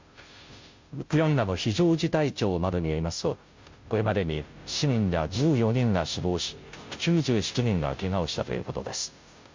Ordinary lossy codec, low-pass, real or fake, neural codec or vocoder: MP3, 48 kbps; 7.2 kHz; fake; codec, 16 kHz, 0.5 kbps, FunCodec, trained on Chinese and English, 25 frames a second